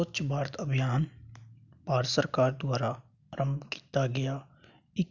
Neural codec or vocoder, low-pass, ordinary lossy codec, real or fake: none; 7.2 kHz; none; real